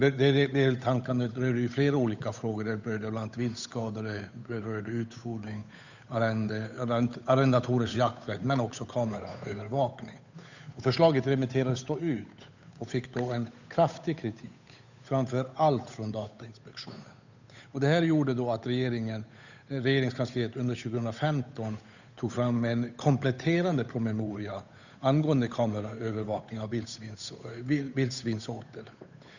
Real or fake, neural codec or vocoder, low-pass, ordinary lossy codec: fake; codec, 16 kHz, 8 kbps, FunCodec, trained on Chinese and English, 25 frames a second; 7.2 kHz; none